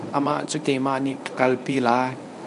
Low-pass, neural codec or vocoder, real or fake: 10.8 kHz; codec, 24 kHz, 0.9 kbps, WavTokenizer, medium speech release version 1; fake